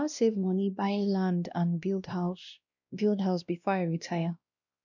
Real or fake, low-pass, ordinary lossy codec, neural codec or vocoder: fake; 7.2 kHz; none; codec, 16 kHz, 1 kbps, X-Codec, WavLM features, trained on Multilingual LibriSpeech